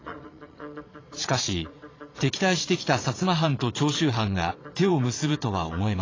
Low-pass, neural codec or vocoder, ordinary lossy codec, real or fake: 7.2 kHz; vocoder, 22.05 kHz, 80 mel bands, Vocos; AAC, 32 kbps; fake